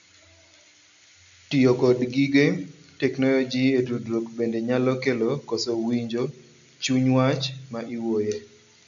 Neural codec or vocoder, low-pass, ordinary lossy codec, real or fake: none; 7.2 kHz; MP3, 96 kbps; real